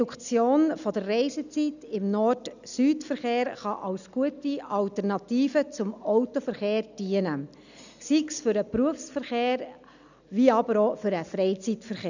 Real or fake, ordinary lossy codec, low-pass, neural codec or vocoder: real; none; 7.2 kHz; none